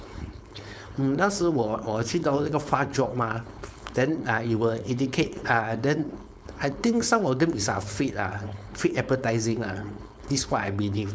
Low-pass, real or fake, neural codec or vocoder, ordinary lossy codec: none; fake; codec, 16 kHz, 4.8 kbps, FACodec; none